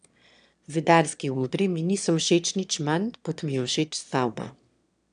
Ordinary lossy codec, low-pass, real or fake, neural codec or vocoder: none; 9.9 kHz; fake; autoencoder, 22.05 kHz, a latent of 192 numbers a frame, VITS, trained on one speaker